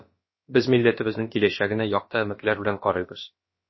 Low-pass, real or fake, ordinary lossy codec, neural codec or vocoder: 7.2 kHz; fake; MP3, 24 kbps; codec, 16 kHz, about 1 kbps, DyCAST, with the encoder's durations